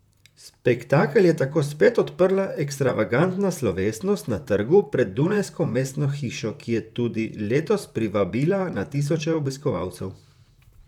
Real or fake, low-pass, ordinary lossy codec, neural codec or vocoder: fake; 19.8 kHz; none; vocoder, 44.1 kHz, 128 mel bands, Pupu-Vocoder